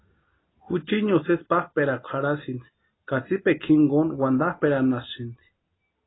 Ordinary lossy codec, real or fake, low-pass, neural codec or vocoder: AAC, 16 kbps; real; 7.2 kHz; none